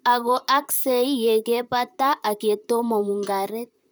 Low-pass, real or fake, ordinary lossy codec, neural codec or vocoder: none; fake; none; vocoder, 44.1 kHz, 128 mel bands, Pupu-Vocoder